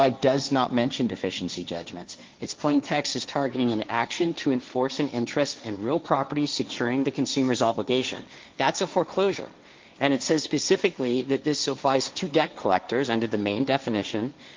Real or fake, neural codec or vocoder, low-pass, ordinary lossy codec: fake; codec, 16 kHz, 1.1 kbps, Voila-Tokenizer; 7.2 kHz; Opus, 24 kbps